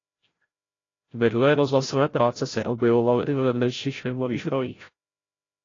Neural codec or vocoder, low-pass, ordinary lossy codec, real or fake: codec, 16 kHz, 0.5 kbps, FreqCodec, larger model; 7.2 kHz; AAC, 32 kbps; fake